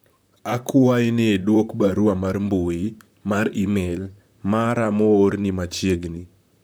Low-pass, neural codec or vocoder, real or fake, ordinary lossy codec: none; vocoder, 44.1 kHz, 128 mel bands, Pupu-Vocoder; fake; none